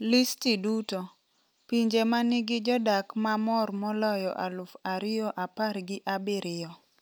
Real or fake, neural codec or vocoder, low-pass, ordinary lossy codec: real; none; none; none